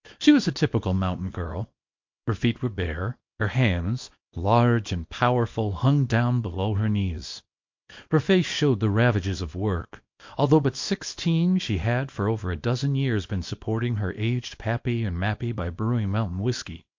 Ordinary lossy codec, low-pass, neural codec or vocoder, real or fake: MP3, 48 kbps; 7.2 kHz; codec, 24 kHz, 0.9 kbps, WavTokenizer, small release; fake